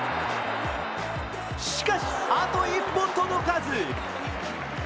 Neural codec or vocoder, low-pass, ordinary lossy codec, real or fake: none; none; none; real